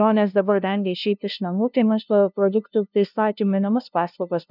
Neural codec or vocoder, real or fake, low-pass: codec, 16 kHz, 0.5 kbps, FunCodec, trained on LibriTTS, 25 frames a second; fake; 5.4 kHz